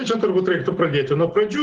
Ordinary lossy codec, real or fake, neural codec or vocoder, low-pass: Opus, 16 kbps; real; none; 10.8 kHz